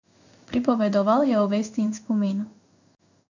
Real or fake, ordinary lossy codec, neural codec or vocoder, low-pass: fake; none; codec, 16 kHz in and 24 kHz out, 1 kbps, XY-Tokenizer; 7.2 kHz